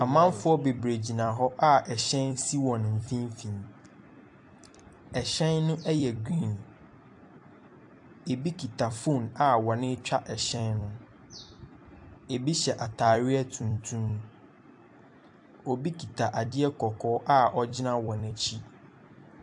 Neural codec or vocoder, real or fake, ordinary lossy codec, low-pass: vocoder, 44.1 kHz, 128 mel bands every 256 samples, BigVGAN v2; fake; AAC, 64 kbps; 10.8 kHz